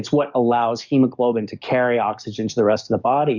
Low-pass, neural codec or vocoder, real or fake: 7.2 kHz; codec, 44.1 kHz, 7.8 kbps, DAC; fake